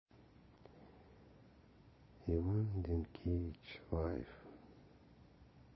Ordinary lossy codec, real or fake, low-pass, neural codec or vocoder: MP3, 24 kbps; fake; 7.2 kHz; vocoder, 22.05 kHz, 80 mel bands, Vocos